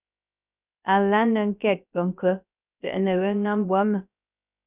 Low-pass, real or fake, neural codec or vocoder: 3.6 kHz; fake; codec, 16 kHz, 0.3 kbps, FocalCodec